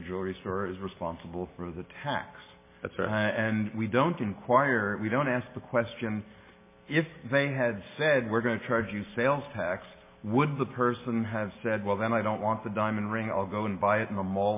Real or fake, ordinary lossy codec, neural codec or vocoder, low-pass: real; MP3, 16 kbps; none; 3.6 kHz